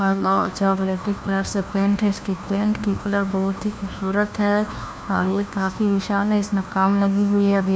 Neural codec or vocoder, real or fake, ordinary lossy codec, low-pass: codec, 16 kHz, 1 kbps, FunCodec, trained on LibriTTS, 50 frames a second; fake; none; none